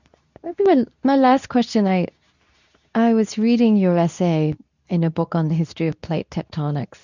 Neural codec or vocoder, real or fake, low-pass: codec, 24 kHz, 0.9 kbps, WavTokenizer, medium speech release version 2; fake; 7.2 kHz